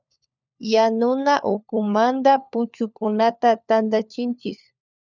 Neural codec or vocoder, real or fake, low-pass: codec, 16 kHz, 4 kbps, FunCodec, trained on LibriTTS, 50 frames a second; fake; 7.2 kHz